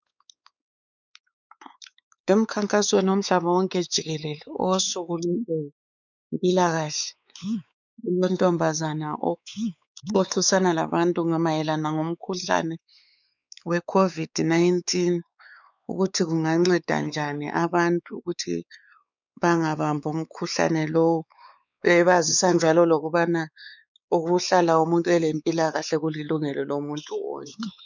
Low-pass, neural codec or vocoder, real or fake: 7.2 kHz; codec, 16 kHz, 4 kbps, X-Codec, WavLM features, trained on Multilingual LibriSpeech; fake